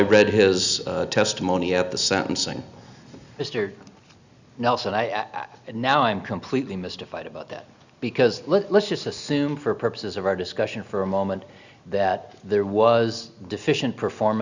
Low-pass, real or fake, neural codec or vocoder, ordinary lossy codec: 7.2 kHz; real; none; Opus, 64 kbps